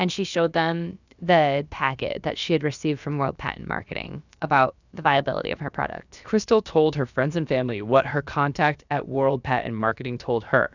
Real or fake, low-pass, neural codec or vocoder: fake; 7.2 kHz; codec, 16 kHz, about 1 kbps, DyCAST, with the encoder's durations